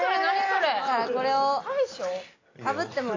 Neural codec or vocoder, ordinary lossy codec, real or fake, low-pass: none; AAC, 32 kbps; real; 7.2 kHz